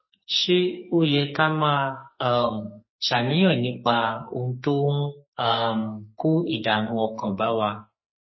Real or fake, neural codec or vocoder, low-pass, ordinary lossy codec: fake; codec, 44.1 kHz, 2.6 kbps, SNAC; 7.2 kHz; MP3, 24 kbps